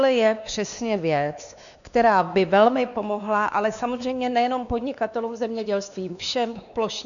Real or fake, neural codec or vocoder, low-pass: fake; codec, 16 kHz, 2 kbps, X-Codec, WavLM features, trained on Multilingual LibriSpeech; 7.2 kHz